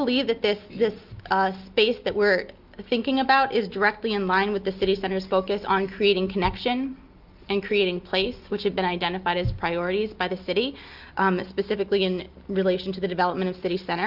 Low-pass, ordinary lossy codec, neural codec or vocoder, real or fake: 5.4 kHz; Opus, 32 kbps; none; real